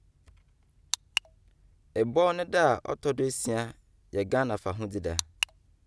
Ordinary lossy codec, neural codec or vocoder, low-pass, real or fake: none; none; none; real